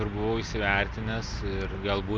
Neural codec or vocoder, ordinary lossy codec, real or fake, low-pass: none; Opus, 32 kbps; real; 7.2 kHz